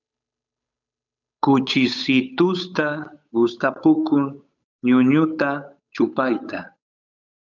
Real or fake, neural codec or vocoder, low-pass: fake; codec, 16 kHz, 8 kbps, FunCodec, trained on Chinese and English, 25 frames a second; 7.2 kHz